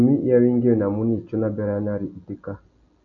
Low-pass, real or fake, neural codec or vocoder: 7.2 kHz; real; none